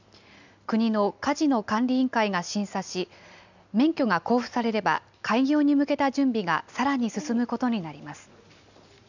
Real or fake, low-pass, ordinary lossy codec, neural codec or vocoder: real; 7.2 kHz; none; none